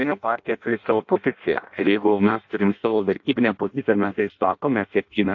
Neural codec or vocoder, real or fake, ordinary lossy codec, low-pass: codec, 16 kHz in and 24 kHz out, 0.6 kbps, FireRedTTS-2 codec; fake; AAC, 48 kbps; 7.2 kHz